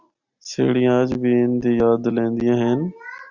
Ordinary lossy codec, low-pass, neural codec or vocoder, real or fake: Opus, 64 kbps; 7.2 kHz; none; real